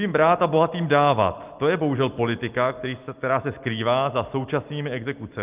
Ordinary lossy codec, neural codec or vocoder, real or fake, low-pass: Opus, 24 kbps; none; real; 3.6 kHz